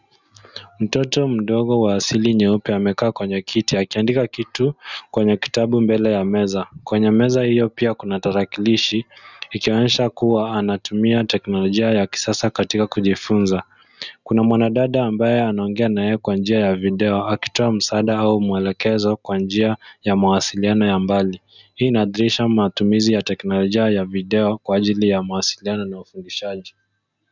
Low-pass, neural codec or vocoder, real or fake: 7.2 kHz; none; real